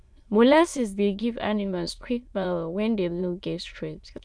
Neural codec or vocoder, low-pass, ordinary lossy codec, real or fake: autoencoder, 22.05 kHz, a latent of 192 numbers a frame, VITS, trained on many speakers; none; none; fake